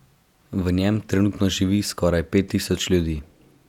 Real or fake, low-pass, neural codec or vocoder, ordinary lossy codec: fake; 19.8 kHz; vocoder, 48 kHz, 128 mel bands, Vocos; none